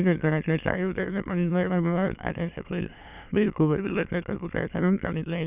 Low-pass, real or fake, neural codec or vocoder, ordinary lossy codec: 3.6 kHz; fake; autoencoder, 22.05 kHz, a latent of 192 numbers a frame, VITS, trained on many speakers; none